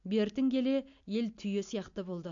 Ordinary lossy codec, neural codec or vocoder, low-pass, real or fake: AAC, 64 kbps; none; 7.2 kHz; real